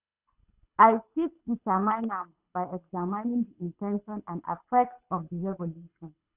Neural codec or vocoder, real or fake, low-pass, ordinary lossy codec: codec, 24 kHz, 6 kbps, HILCodec; fake; 3.6 kHz; none